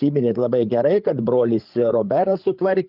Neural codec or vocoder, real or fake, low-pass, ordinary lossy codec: codec, 16 kHz, 8 kbps, FreqCodec, larger model; fake; 5.4 kHz; Opus, 32 kbps